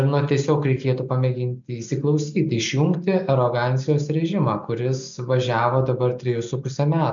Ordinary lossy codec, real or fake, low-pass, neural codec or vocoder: MP3, 64 kbps; real; 7.2 kHz; none